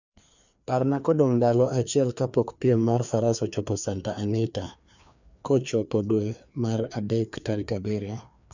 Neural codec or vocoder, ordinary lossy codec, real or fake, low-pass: codec, 16 kHz, 2 kbps, FreqCodec, larger model; none; fake; 7.2 kHz